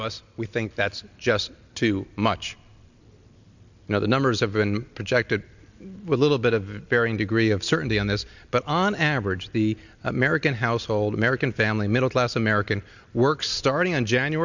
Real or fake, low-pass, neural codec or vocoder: real; 7.2 kHz; none